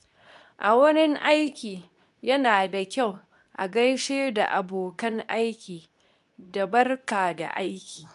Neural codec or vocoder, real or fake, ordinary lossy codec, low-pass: codec, 24 kHz, 0.9 kbps, WavTokenizer, medium speech release version 2; fake; none; 10.8 kHz